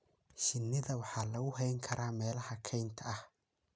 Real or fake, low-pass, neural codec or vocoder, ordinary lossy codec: real; none; none; none